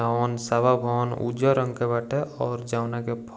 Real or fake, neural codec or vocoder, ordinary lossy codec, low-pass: real; none; none; none